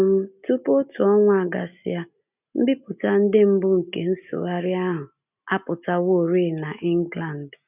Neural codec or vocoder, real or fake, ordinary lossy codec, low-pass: none; real; none; 3.6 kHz